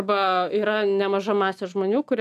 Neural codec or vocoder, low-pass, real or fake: none; 14.4 kHz; real